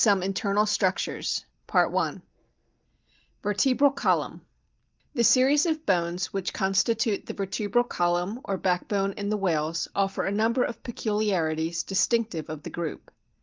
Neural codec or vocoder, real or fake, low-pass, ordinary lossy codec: none; real; 7.2 kHz; Opus, 32 kbps